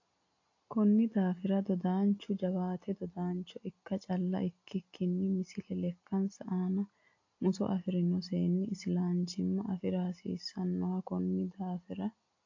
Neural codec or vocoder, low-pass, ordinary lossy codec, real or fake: none; 7.2 kHz; AAC, 48 kbps; real